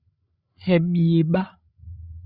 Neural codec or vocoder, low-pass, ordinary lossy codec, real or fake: codec, 16 kHz, 16 kbps, FreqCodec, larger model; 5.4 kHz; Opus, 64 kbps; fake